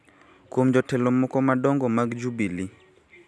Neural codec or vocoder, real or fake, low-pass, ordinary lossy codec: none; real; none; none